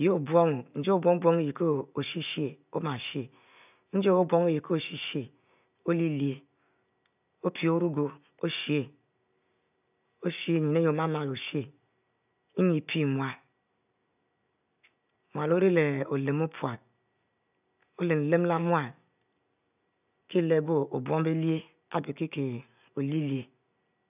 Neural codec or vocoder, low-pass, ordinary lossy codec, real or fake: none; 3.6 kHz; none; real